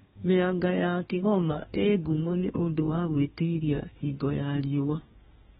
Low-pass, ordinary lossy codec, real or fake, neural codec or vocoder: 14.4 kHz; AAC, 16 kbps; fake; codec, 32 kHz, 1.9 kbps, SNAC